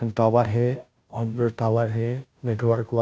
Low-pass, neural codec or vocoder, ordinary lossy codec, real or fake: none; codec, 16 kHz, 0.5 kbps, FunCodec, trained on Chinese and English, 25 frames a second; none; fake